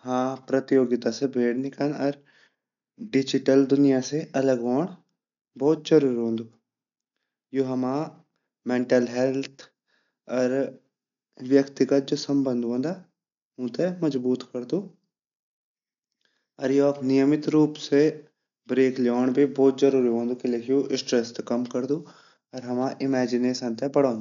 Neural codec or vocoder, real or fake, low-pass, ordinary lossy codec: none; real; 7.2 kHz; none